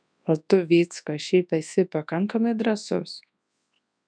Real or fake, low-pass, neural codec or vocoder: fake; 9.9 kHz; codec, 24 kHz, 0.9 kbps, WavTokenizer, large speech release